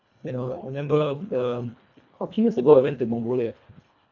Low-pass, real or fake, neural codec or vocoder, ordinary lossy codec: 7.2 kHz; fake; codec, 24 kHz, 1.5 kbps, HILCodec; none